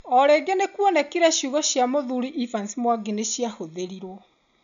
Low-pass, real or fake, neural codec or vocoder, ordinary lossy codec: 7.2 kHz; real; none; none